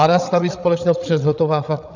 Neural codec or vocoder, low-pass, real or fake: codec, 16 kHz, 16 kbps, FreqCodec, larger model; 7.2 kHz; fake